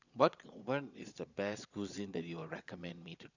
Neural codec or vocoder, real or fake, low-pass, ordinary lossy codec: vocoder, 22.05 kHz, 80 mel bands, Vocos; fake; 7.2 kHz; none